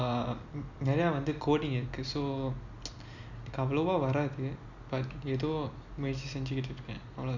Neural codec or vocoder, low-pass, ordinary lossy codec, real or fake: none; 7.2 kHz; none; real